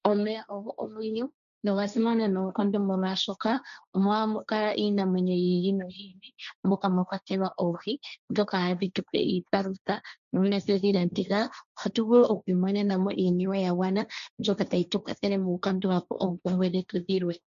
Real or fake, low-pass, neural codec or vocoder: fake; 7.2 kHz; codec, 16 kHz, 1.1 kbps, Voila-Tokenizer